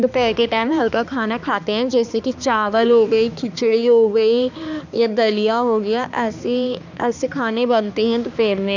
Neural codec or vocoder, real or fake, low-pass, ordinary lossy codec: codec, 16 kHz, 2 kbps, X-Codec, HuBERT features, trained on balanced general audio; fake; 7.2 kHz; none